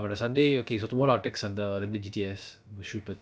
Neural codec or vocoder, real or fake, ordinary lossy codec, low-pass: codec, 16 kHz, about 1 kbps, DyCAST, with the encoder's durations; fake; none; none